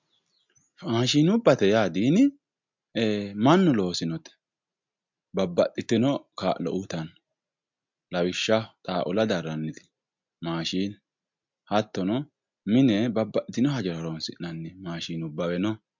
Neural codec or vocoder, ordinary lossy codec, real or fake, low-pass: none; MP3, 64 kbps; real; 7.2 kHz